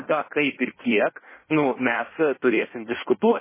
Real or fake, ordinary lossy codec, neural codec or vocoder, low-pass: fake; MP3, 16 kbps; codec, 16 kHz in and 24 kHz out, 1.1 kbps, FireRedTTS-2 codec; 3.6 kHz